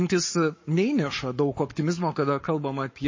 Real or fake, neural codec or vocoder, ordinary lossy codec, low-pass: fake; codec, 44.1 kHz, 7.8 kbps, Pupu-Codec; MP3, 32 kbps; 7.2 kHz